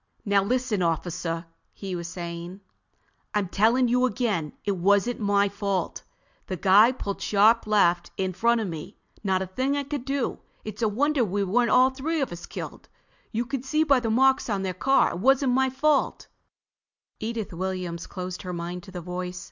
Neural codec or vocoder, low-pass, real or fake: none; 7.2 kHz; real